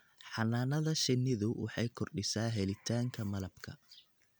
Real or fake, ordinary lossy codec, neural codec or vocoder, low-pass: real; none; none; none